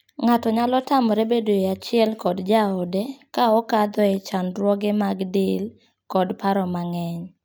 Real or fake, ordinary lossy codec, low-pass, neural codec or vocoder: real; none; none; none